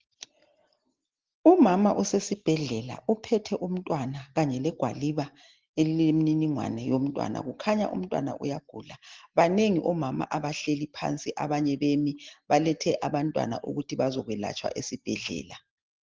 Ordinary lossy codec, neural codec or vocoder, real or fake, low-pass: Opus, 16 kbps; none; real; 7.2 kHz